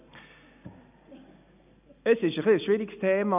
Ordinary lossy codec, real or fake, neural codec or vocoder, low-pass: none; real; none; 3.6 kHz